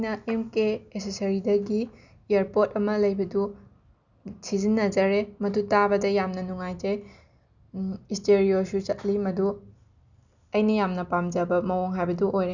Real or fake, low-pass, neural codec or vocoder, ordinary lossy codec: real; 7.2 kHz; none; none